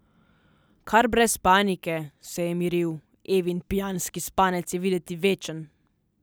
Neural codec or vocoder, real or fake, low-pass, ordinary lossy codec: none; real; none; none